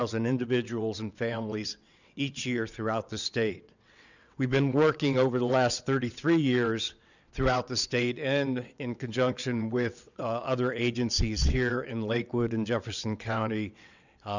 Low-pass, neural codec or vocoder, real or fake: 7.2 kHz; vocoder, 22.05 kHz, 80 mel bands, WaveNeXt; fake